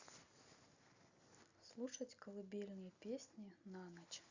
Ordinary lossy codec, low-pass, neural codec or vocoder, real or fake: Opus, 64 kbps; 7.2 kHz; none; real